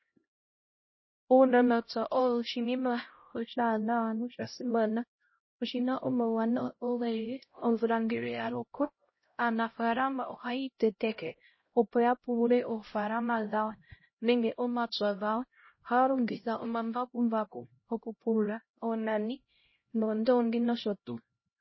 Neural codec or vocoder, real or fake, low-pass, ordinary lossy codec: codec, 16 kHz, 0.5 kbps, X-Codec, HuBERT features, trained on LibriSpeech; fake; 7.2 kHz; MP3, 24 kbps